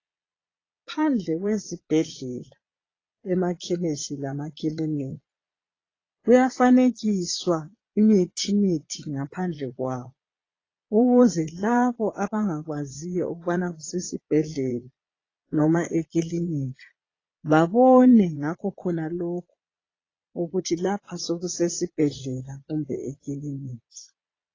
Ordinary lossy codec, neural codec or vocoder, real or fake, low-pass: AAC, 32 kbps; vocoder, 22.05 kHz, 80 mel bands, Vocos; fake; 7.2 kHz